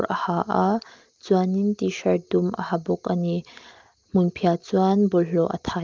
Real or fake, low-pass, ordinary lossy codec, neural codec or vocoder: real; 7.2 kHz; Opus, 24 kbps; none